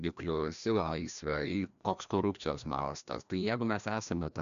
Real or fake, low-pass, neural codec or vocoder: fake; 7.2 kHz; codec, 16 kHz, 1 kbps, FreqCodec, larger model